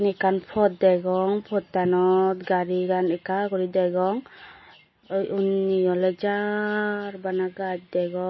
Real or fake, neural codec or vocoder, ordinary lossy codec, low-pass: real; none; MP3, 24 kbps; 7.2 kHz